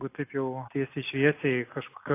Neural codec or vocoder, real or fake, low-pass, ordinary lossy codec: none; real; 3.6 kHz; AAC, 24 kbps